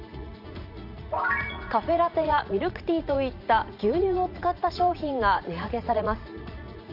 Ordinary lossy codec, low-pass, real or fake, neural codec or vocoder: AAC, 48 kbps; 5.4 kHz; fake; vocoder, 44.1 kHz, 80 mel bands, Vocos